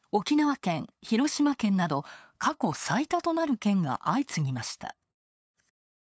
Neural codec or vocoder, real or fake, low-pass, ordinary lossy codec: codec, 16 kHz, 8 kbps, FunCodec, trained on LibriTTS, 25 frames a second; fake; none; none